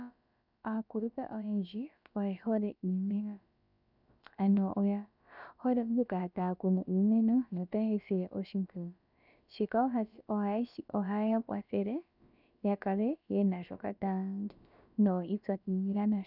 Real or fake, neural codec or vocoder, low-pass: fake; codec, 16 kHz, about 1 kbps, DyCAST, with the encoder's durations; 5.4 kHz